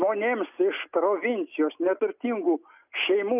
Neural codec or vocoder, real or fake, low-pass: none; real; 3.6 kHz